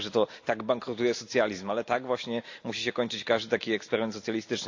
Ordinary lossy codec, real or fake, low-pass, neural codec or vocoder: AAC, 48 kbps; real; 7.2 kHz; none